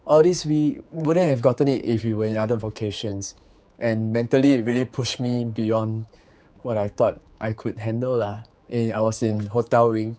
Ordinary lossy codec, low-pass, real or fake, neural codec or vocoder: none; none; fake; codec, 16 kHz, 4 kbps, X-Codec, HuBERT features, trained on general audio